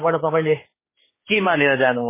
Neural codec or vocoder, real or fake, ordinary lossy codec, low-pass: codec, 16 kHz, 4 kbps, X-Codec, HuBERT features, trained on general audio; fake; MP3, 16 kbps; 3.6 kHz